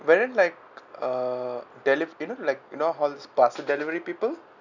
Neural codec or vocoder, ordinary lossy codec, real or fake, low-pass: none; none; real; 7.2 kHz